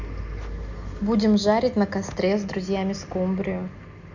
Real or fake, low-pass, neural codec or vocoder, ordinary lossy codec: real; 7.2 kHz; none; none